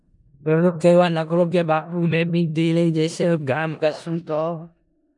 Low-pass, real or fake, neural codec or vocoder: 10.8 kHz; fake; codec, 16 kHz in and 24 kHz out, 0.4 kbps, LongCat-Audio-Codec, four codebook decoder